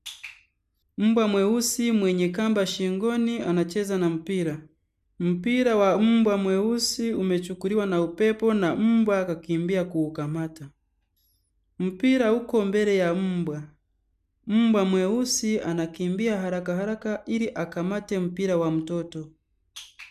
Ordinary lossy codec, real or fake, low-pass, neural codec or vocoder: none; real; 14.4 kHz; none